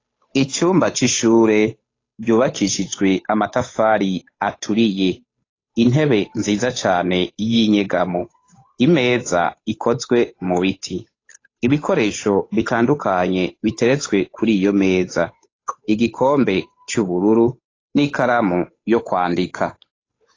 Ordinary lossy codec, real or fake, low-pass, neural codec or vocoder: AAC, 32 kbps; fake; 7.2 kHz; codec, 16 kHz, 8 kbps, FunCodec, trained on Chinese and English, 25 frames a second